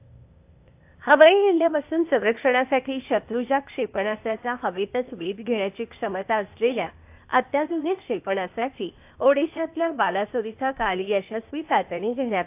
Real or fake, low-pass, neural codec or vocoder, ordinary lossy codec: fake; 3.6 kHz; codec, 16 kHz, 0.8 kbps, ZipCodec; AAC, 32 kbps